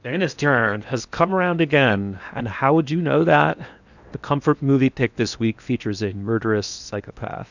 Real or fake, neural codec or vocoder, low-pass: fake; codec, 16 kHz in and 24 kHz out, 0.8 kbps, FocalCodec, streaming, 65536 codes; 7.2 kHz